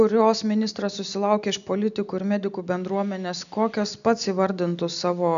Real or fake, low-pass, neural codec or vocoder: real; 7.2 kHz; none